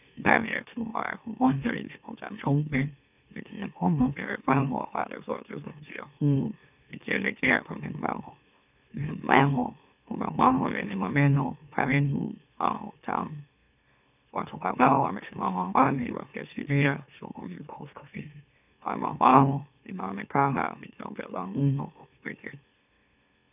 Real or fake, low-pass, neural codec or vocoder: fake; 3.6 kHz; autoencoder, 44.1 kHz, a latent of 192 numbers a frame, MeloTTS